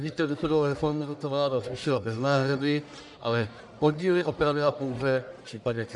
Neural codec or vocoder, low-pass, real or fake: codec, 44.1 kHz, 1.7 kbps, Pupu-Codec; 10.8 kHz; fake